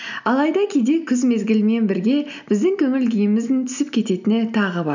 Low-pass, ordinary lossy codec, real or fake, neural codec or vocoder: 7.2 kHz; none; real; none